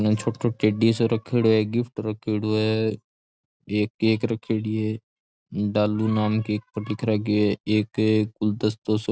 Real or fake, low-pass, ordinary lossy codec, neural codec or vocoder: real; none; none; none